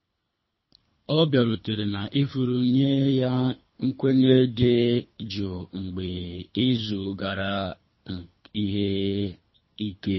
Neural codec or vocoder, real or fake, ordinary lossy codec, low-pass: codec, 24 kHz, 3 kbps, HILCodec; fake; MP3, 24 kbps; 7.2 kHz